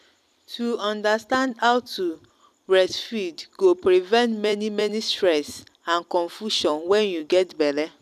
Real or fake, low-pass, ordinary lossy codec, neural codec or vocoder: fake; 14.4 kHz; none; vocoder, 44.1 kHz, 128 mel bands every 256 samples, BigVGAN v2